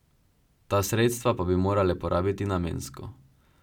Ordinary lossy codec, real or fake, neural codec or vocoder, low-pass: none; real; none; 19.8 kHz